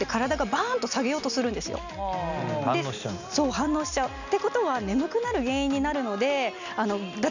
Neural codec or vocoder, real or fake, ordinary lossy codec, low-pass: none; real; none; 7.2 kHz